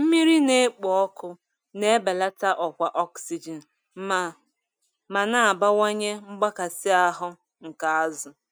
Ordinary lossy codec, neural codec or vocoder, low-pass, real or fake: none; none; none; real